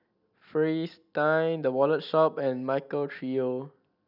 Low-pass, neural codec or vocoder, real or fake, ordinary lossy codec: 5.4 kHz; none; real; none